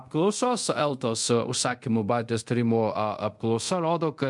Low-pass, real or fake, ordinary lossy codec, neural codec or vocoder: 10.8 kHz; fake; MP3, 64 kbps; codec, 24 kHz, 0.5 kbps, DualCodec